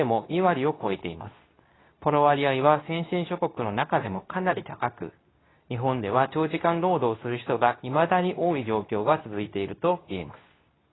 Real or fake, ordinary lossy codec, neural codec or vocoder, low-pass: fake; AAC, 16 kbps; codec, 24 kHz, 0.9 kbps, WavTokenizer, medium speech release version 2; 7.2 kHz